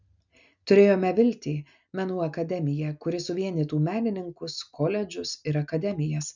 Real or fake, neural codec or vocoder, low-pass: real; none; 7.2 kHz